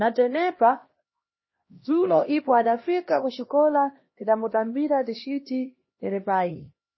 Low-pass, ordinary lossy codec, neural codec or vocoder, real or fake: 7.2 kHz; MP3, 24 kbps; codec, 16 kHz, 1 kbps, X-Codec, HuBERT features, trained on LibriSpeech; fake